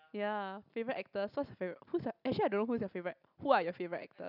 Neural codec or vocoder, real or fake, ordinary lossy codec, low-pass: none; real; none; 5.4 kHz